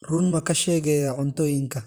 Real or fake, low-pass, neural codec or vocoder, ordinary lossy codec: fake; none; vocoder, 44.1 kHz, 128 mel bands, Pupu-Vocoder; none